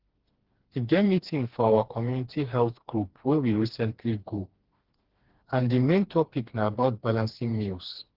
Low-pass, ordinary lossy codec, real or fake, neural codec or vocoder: 5.4 kHz; Opus, 16 kbps; fake; codec, 16 kHz, 2 kbps, FreqCodec, smaller model